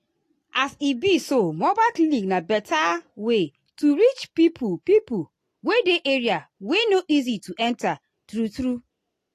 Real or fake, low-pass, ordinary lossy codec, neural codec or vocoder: real; 14.4 kHz; AAC, 48 kbps; none